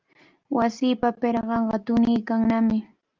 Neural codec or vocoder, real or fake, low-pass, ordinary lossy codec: none; real; 7.2 kHz; Opus, 24 kbps